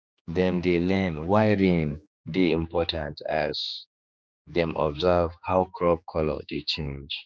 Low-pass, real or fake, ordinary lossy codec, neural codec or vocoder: none; fake; none; codec, 16 kHz, 2 kbps, X-Codec, HuBERT features, trained on general audio